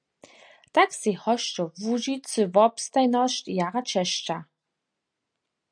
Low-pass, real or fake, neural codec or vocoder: 9.9 kHz; real; none